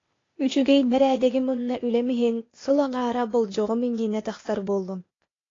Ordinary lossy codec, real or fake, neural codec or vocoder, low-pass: AAC, 32 kbps; fake; codec, 16 kHz, 0.8 kbps, ZipCodec; 7.2 kHz